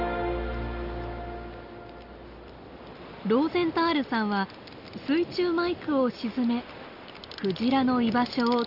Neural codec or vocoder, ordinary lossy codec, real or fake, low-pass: none; Opus, 64 kbps; real; 5.4 kHz